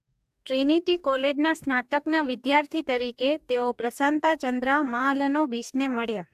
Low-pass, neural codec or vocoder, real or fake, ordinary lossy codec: 14.4 kHz; codec, 44.1 kHz, 2.6 kbps, DAC; fake; AAC, 96 kbps